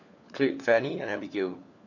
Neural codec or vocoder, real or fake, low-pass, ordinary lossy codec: codec, 16 kHz, 4 kbps, FunCodec, trained on LibriTTS, 50 frames a second; fake; 7.2 kHz; none